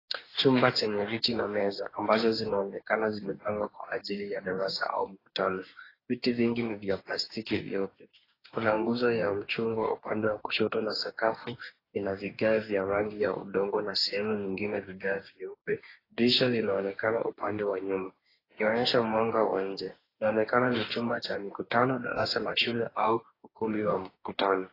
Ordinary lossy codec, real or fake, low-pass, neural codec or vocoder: AAC, 24 kbps; fake; 5.4 kHz; codec, 44.1 kHz, 2.6 kbps, DAC